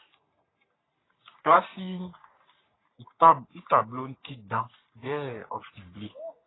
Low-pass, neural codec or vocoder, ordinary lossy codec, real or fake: 7.2 kHz; codec, 44.1 kHz, 3.4 kbps, Pupu-Codec; AAC, 16 kbps; fake